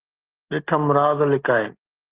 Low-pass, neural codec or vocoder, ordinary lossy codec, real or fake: 3.6 kHz; none; Opus, 16 kbps; real